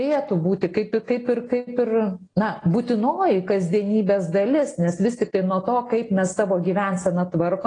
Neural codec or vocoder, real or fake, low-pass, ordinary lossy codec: none; real; 9.9 kHz; AAC, 32 kbps